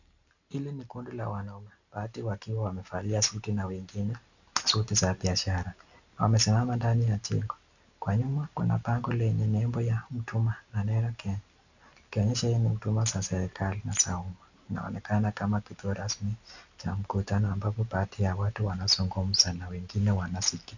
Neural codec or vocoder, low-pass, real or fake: none; 7.2 kHz; real